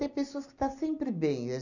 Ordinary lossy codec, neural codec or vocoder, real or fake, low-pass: none; none; real; 7.2 kHz